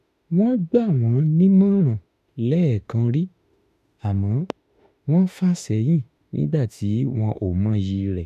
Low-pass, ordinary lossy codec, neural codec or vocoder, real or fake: 14.4 kHz; none; autoencoder, 48 kHz, 32 numbers a frame, DAC-VAE, trained on Japanese speech; fake